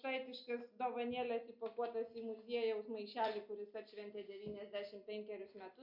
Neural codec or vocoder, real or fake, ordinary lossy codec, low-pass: none; real; MP3, 48 kbps; 5.4 kHz